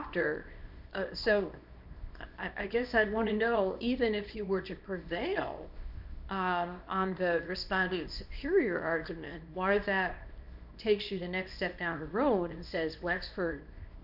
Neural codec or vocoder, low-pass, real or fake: codec, 24 kHz, 0.9 kbps, WavTokenizer, small release; 5.4 kHz; fake